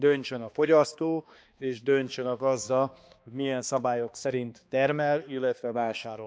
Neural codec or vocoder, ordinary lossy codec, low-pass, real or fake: codec, 16 kHz, 2 kbps, X-Codec, HuBERT features, trained on balanced general audio; none; none; fake